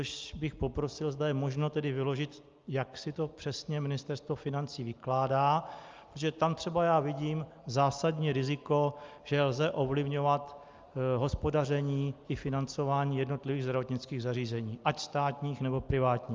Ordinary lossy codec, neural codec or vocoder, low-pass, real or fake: Opus, 24 kbps; none; 7.2 kHz; real